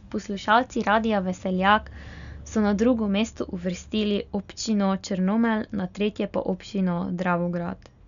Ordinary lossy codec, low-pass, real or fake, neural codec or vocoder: none; 7.2 kHz; real; none